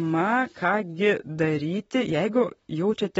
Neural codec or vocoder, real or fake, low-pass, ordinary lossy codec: vocoder, 44.1 kHz, 128 mel bands, Pupu-Vocoder; fake; 19.8 kHz; AAC, 24 kbps